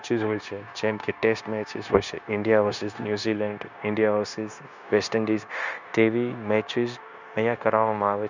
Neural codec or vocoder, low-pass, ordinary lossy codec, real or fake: codec, 16 kHz, 0.9 kbps, LongCat-Audio-Codec; 7.2 kHz; none; fake